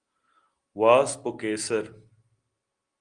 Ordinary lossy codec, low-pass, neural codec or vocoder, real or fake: Opus, 24 kbps; 9.9 kHz; none; real